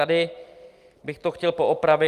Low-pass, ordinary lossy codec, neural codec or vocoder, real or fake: 14.4 kHz; Opus, 32 kbps; none; real